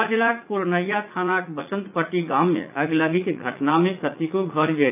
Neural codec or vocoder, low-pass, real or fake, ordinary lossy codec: vocoder, 44.1 kHz, 80 mel bands, Vocos; 3.6 kHz; fake; AAC, 32 kbps